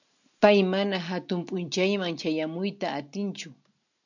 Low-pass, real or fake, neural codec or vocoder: 7.2 kHz; real; none